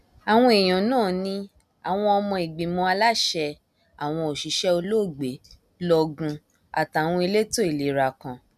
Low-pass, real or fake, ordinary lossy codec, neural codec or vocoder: 14.4 kHz; real; none; none